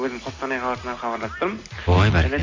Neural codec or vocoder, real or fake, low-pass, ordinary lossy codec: none; real; 7.2 kHz; MP3, 48 kbps